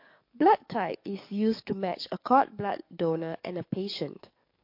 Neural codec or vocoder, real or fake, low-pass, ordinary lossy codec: codec, 44.1 kHz, 7.8 kbps, DAC; fake; 5.4 kHz; MP3, 32 kbps